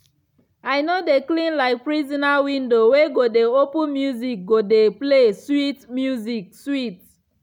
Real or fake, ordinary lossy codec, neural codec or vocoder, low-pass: real; none; none; 19.8 kHz